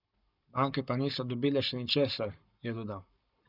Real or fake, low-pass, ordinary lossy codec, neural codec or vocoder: fake; 5.4 kHz; none; codec, 44.1 kHz, 7.8 kbps, Pupu-Codec